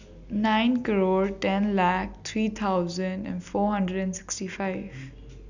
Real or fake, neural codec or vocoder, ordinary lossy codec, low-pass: real; none; none; 7.2 kHz